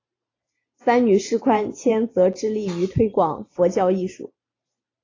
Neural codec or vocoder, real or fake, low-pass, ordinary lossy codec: vocoder, 44.1 kHz, 128 mel bands every 512 samples, BigVGAN v2; fake; 7.2 kHz; AAC, 32 kbps